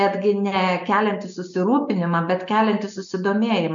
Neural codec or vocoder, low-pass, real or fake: none; 7.2 kHz; real